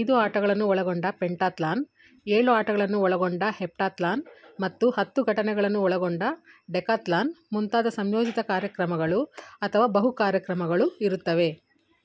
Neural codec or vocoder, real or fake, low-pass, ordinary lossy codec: none; real; none; none